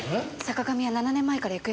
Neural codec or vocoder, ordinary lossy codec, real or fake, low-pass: none; none; real; none